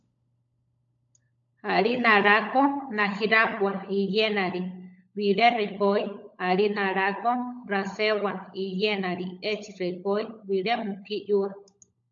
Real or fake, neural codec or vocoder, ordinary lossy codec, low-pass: fake; codec, 16 kHz, 16 kbps, FunCodec, trained on LibriTTS, 50 frames a second; AAC, 64 kbps; 7.2 kHz